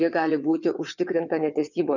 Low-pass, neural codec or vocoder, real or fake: 7.2 kHz; vocoder, 22.05 kHz, 80 mel bands, WaveNeXt; fake